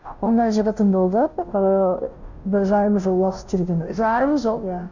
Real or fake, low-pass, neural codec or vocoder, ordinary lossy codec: fake; 7.2 kHz; codec, 16 kHz, 0.5 kbps, FunCodec, trained on Chinese and English, 25 frames a second; none